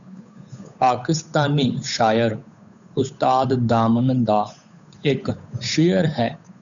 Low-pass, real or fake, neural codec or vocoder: 7.2 kHz; fake; codec, 16 kHz, 8 kbps, FunCodec, trained on Chinese and English, 25 frames a second